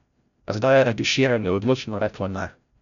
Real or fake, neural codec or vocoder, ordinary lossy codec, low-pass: fake; codec, 16 kHz, 0.5 kbps, FreqCodec, larger model; MP3, 96 kbps; 7.2 kHz